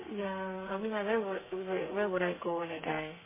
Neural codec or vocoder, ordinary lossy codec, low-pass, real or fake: codec, 32 kHz, 1.9 kbps, SNAC; MP3, 16 kbps; 3.6 kHz; fake